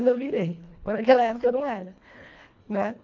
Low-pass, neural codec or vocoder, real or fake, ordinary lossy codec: 7.2 kHz; codec, 24 kHz, 1.5 kbps, HILCodec; fake; AAC, 48 kbps